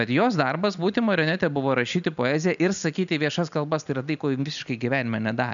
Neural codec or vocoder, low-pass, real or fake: none; 7.2 kHz; real